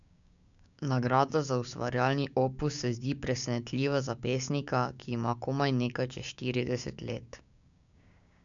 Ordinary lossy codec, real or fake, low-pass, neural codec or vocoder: none; fake; 7.2 kHz; codec, 16 kHz, 6 kbps, DAC